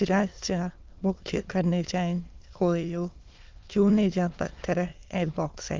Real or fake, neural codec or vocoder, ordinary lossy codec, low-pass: fake; autoencoder, 22.05 kHz, a latent of 192 numbers a frame, VITS, trained on many speakers; Opus, 24 kbps; 7.2 kHz